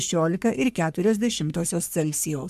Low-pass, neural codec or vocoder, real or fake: 14.4 kHz; codec, 44.1 kHz, 3.4 kbps, Pupu-Codec; fake